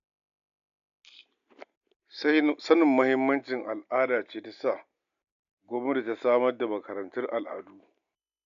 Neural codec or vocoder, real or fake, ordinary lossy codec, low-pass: none; real; none; 7.2 kHz